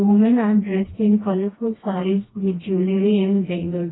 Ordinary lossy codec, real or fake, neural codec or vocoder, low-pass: AAC, 16 kbps; fake; codec, 16 kHz, 1 kbps, FreqCodec, smaller model; 7.2 kHz